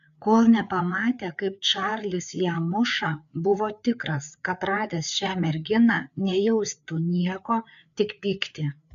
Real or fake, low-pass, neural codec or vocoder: fake; 7.2 kHz; codec, 16 kHz, 4 kbps, FreqCodec, larger model